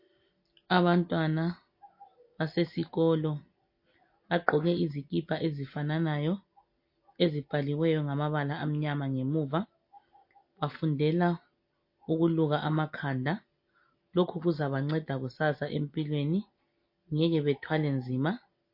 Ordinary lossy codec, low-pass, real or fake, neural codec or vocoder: MP3, 32 kbps; 5.4 kHz; real; none